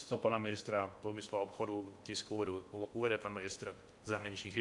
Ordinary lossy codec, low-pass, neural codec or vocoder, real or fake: AAC, 64 kbps; 10.8 kHz; codec, 16 kHz in and 24 kHz out, 0.8 kbps, FocalCodec, streaming, 65536 codes; fake